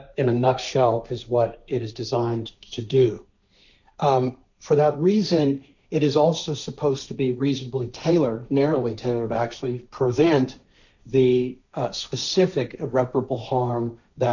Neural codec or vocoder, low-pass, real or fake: codec, 16 kHz, 1.1 kbps, Voila-Tokenizer; 7.2 kHz; fake